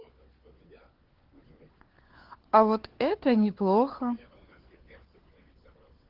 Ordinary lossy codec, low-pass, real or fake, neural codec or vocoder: Opus, 16 kbps; 5.4 kHz; fake; codec, 16 kHz, 4 kbps, FunCodec, trained on LibriTTS, 50 frames a second